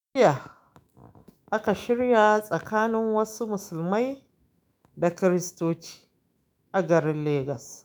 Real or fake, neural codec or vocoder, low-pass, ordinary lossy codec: fake; autoencoder, 48 kHz, 128 numbers a frame, DAC-VAE, trained on Japanese speech; none; none